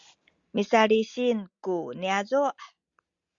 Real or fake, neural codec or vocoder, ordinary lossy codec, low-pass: real; none; Opus, 64 kbps; 7.2 kHz